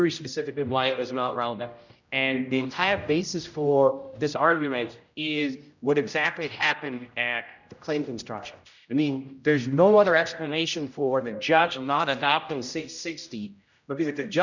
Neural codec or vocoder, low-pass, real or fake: codec, 16 kHz, 0.5 kbps, X-Codec, HuBERT features, trained on general audio; 7.2 kHz; fake